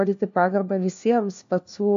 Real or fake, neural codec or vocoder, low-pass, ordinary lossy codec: fake; codec, 16 kHz, 1 kbps, FunCodec, trained on LibriTTS, 50 frames a second; 7.2 kHz; MP3, 64 kbps